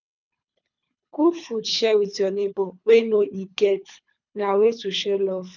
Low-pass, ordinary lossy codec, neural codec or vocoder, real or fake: 7.2 kHz; none; codec, 24 kHz, 3 kbps, HILCodec; fake